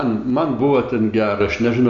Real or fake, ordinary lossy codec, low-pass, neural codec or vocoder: real; MP3, 96 kbps; 7.2 kHz; none